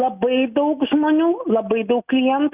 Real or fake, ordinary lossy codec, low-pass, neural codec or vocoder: real; Opus, 64 kbps; 3.6 kHz; none